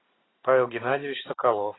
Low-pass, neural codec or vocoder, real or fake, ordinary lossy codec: 7.2 kHz; none; real; AAC, 16 kbps